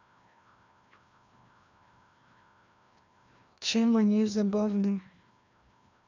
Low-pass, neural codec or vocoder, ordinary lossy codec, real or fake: 7.2 kHz; codec, 16 kHz, 1 kbps, FreqCodec, larger model; none; fake